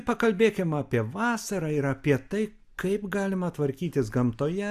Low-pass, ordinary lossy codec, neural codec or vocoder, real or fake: 14.4 kHz; Opus, 64 kbps; none; real